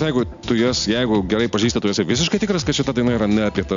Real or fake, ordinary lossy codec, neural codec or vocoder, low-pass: real; MP3, 64 kbps; none; 7.2 kHz